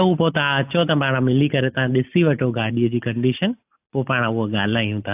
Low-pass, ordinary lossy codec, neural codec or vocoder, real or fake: 3.6 kHz; AAC, 32 kbps; none; real